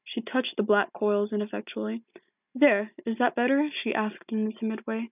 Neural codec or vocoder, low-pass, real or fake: none; 3.6 kHz; real